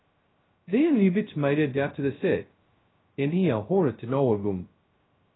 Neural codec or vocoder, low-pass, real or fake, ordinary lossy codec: codec, 16 kHz, 0.2 kbps, FocalCodec; 7.2 kHz; fake; AAC, 16 kbps